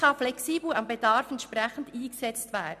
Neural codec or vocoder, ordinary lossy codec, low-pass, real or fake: none; none; 14.4 kHz; real